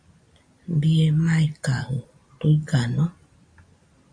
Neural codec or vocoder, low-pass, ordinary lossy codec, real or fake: none; 9.9 kHz; AAC, 64 kbps; real